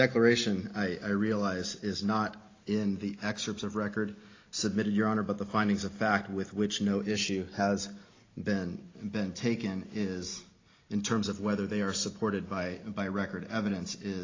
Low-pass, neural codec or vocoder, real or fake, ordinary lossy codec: 7.2 kHz; none; real; AAC, 32 kbps